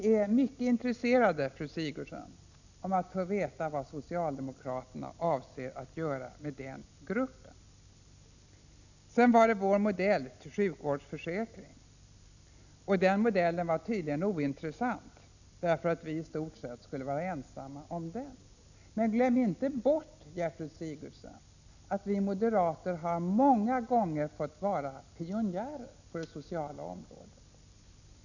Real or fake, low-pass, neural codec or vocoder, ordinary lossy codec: real; 7.2 kHz; none; none